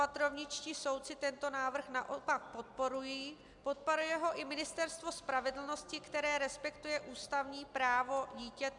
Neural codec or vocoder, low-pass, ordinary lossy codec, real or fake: none; 10.8 kHz; MP3, 96 kbps; real